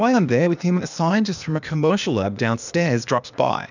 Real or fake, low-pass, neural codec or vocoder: fake; 7.2 kHz; codec, 16 kHz, 0.8 kbps, ZipCodec